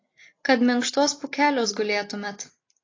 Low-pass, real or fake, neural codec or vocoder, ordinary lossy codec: 7.2 kHz; real; none; AAC, 32 kbps